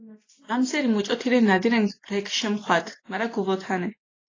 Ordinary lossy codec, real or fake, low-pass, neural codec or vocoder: AAC, 32 kbps; real; 7.2 kHz; none